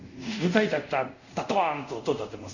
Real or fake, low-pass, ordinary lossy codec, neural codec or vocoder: fake; 7.2 kHz; none; codec, 24 kHz, 0.5 kbps, DualCodec